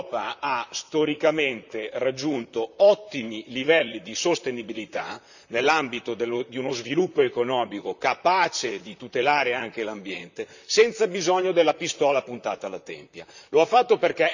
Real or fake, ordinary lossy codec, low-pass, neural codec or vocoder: fake; none; 7.2 kHz; vocoder, 44.1 kHz, 128 mel bands, Pupu-Vocoder